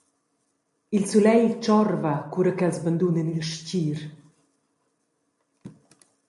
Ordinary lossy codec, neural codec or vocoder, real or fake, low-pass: MP3, 48 kbps; none; real; 14.4 kHz